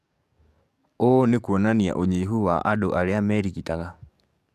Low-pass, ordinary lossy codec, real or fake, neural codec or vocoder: 14.4 kHz; MP3, 96 kbps; fake; codec, 44.1 kHz, 7.8 kbps, DAC